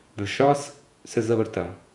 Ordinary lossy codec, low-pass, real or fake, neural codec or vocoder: none; 10.8 kHz; fake; vocoder, 48 kHz, 128 mel bands, Vocos